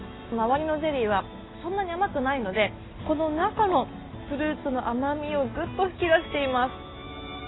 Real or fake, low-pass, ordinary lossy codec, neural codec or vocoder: real; 7.2 kHz; AAC, 16 kbps; none